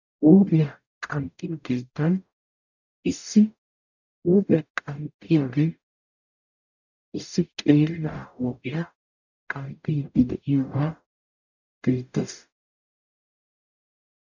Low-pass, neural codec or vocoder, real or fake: 7.2 kHz; codec, 44.1 kHz, 0.9 kbps, DAC; fake